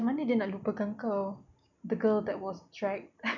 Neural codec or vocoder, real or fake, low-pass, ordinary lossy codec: none; real; 7.2 kHz; none